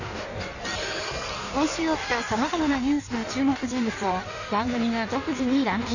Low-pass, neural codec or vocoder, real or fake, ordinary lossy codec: 7.2 kHz; codec, 16 kHz in and 24 kHz out, 1.1 kbps, FireRedTTS-2 codec; fake; none